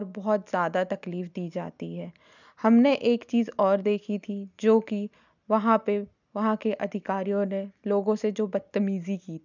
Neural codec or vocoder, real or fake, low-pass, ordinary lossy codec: none; real; 7.2 kHz; none